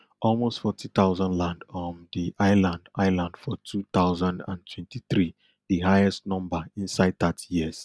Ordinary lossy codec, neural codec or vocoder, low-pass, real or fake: none; none; none; real